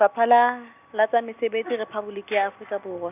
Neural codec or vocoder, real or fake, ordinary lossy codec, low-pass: none; real; none; 3.6 kHz